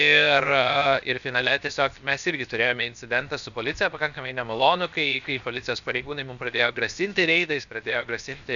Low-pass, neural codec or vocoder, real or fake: 7.2 kHz; codec, 16 kHz, 0.7 kbps, FocalCodec; fake